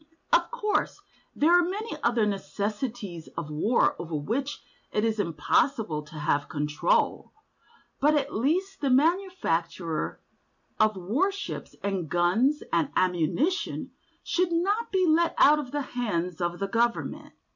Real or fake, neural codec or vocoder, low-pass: real; none; 7.2 kHz